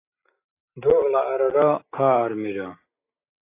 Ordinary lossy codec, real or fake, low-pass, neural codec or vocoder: AAC, 24 kbps; real; 3.6 kHz; none